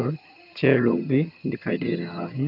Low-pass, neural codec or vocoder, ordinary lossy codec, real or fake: 5.4 kHz; vocoder, 22.05 kHz, 80 mel bands, HiFi-GAN; MP3, 48 kbps; fake